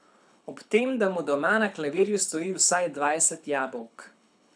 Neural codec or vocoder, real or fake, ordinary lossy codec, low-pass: codec, 24 kHz, 6 kbps, HILCodec; fake; none; 9.9 kHz